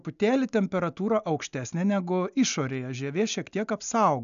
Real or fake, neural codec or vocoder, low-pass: real; none; 7.2 kHz